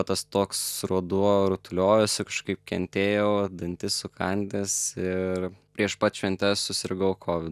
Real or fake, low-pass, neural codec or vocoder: real; 14.4 kHz; none